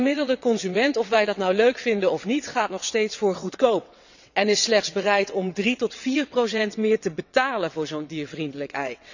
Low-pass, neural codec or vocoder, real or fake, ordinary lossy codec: 7.2 kHz; vocoder, 22.05 kHz, 80 mel bands, WaveNeXt; fake; none